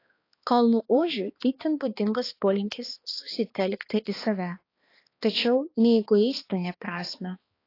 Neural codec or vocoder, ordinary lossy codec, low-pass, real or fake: codec, 16 kHz, 2 kbps, X-Codec, HuBERT features, trained on balanced general audio; AAC, 32 kbps; 5.4 kHz; fake